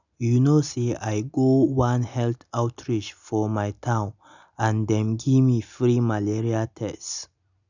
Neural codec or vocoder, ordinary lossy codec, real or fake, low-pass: none; none; real; 7.2 kHz